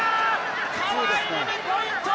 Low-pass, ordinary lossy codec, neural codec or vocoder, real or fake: none; none; none; real